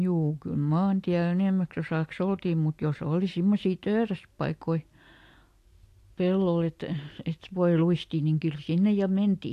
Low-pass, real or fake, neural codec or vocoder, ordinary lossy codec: 14.4 kHz; real; none; AAC, 64 kbps